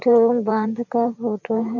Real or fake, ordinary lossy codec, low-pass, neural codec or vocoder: fake; none; 7.2 kHz; vocoder, 44.1 kHz, 128 mel bands every 512 samples, BigVGAN v2